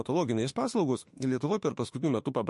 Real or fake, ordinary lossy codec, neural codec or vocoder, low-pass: fake; MP3, 48 kbps; autoencoder, 48 kHz, 32 numbers a frame, DAC-VAE, trained on Japanese speech; 14.4 kHz